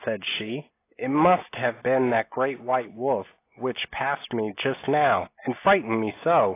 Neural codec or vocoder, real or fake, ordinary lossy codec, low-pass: none; real; AAC, 24 kbps; 3.6 kHz